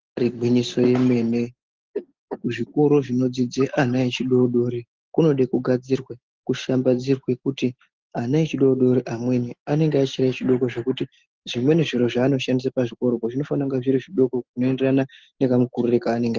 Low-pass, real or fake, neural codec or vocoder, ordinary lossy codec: 7.2 kHz; real; none; Opus, 16 kbps